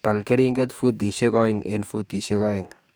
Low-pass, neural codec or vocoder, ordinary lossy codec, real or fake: none; codec, 44.1 kHz, 2.6 kbps, DAC; none; fake